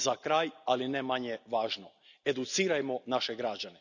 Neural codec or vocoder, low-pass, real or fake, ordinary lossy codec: none; 7.2 kHz; real; none